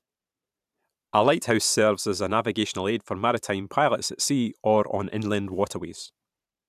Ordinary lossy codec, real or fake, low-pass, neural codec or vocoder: none; fake; 14.4 kHz; vocoder, 44.1 kHz, 128 mel bands every 512 samples, BigVGAN v2